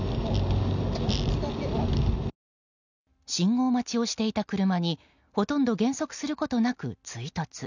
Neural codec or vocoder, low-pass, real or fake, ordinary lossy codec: none; 7.2 kHz; real; none